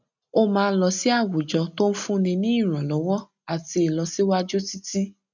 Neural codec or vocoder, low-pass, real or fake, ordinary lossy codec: none; 7.2 kHz; real; none